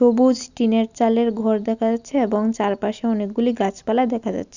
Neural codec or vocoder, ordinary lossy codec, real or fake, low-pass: none; none; real; 7.2 kHz